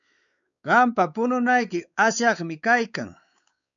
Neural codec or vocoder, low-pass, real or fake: codec, 16 kHz, 4 kbps, X-Codec, WavLM features, trained on Multilingual LibriSpeech; 7.2 kHz; fake